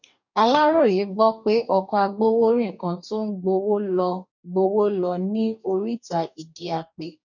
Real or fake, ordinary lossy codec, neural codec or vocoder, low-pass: fake; none; codec, 44.1 kHz, 2.6 kbps, DAC; 7.2 kHz